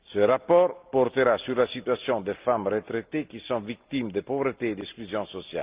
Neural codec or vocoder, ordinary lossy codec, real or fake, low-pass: none; Opus, 32 kbps; real; 3.6 kHz